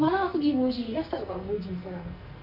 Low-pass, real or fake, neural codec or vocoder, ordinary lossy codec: 5.4 kHz; fake; codec, 44.1 kHz, 2.6 kbps, SNAC; none